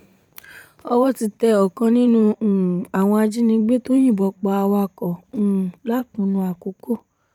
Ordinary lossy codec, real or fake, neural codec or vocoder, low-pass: none; fake; vocoder, 44.1 kHz, 128 mel bands every 512 samples, BigVGAN v2; 19.8 kHz